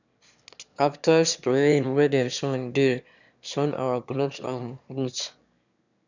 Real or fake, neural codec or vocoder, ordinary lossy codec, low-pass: fake; autoencoder, 22.05 kHz, a latent of 192 numbers a frame, VITS, trained on one speaker; none; 7.2 kHz